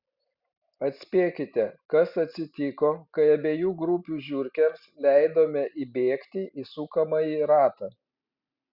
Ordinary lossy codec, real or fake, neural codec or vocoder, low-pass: Opus, 64 kbps; real; none; 5.4 kHz